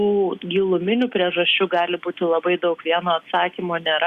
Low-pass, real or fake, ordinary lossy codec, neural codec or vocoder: 14.4 kHz; real; Opus, 64 kbps; none